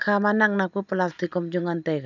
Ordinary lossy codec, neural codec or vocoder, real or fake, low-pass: none; none; real; 7.2 kHz